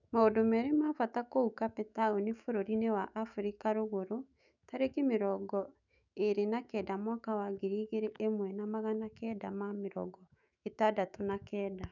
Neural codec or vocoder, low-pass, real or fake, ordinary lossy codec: autoencoder, 48 kHz, 128 numbers a frame, DAC-VAE, trained on Japanese speech; 7.2 kHz; fake; none